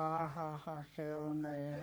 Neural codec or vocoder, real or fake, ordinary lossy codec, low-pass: codec, 44.1 kHz, 3.4 kbps, Pupu-Codec; fake; none; none